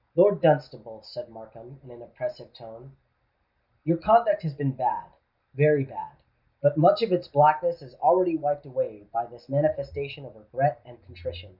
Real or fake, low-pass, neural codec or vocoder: real; 5.4 kHz; none